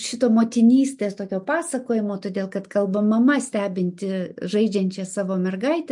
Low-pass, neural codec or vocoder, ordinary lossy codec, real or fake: 10.8 kHz; none; MP3, 64 kbps; real